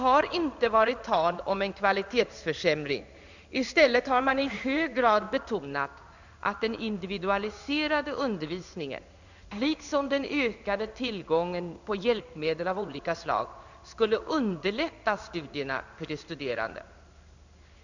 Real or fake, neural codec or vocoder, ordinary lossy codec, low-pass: fake; codec, 16 kHz in and 24 kHz out, 1 kbps, XY-Tokenizer; none; 7.2 kHz